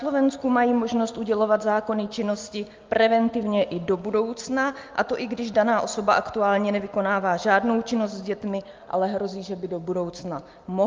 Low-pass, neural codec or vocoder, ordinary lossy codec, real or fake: 7.2 kHz; none; Opus, 24 kbps; real